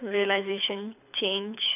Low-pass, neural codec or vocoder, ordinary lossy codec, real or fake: 3.6 kHz; codec, 24 kHz, 6 kbps, HILCodec; none; fake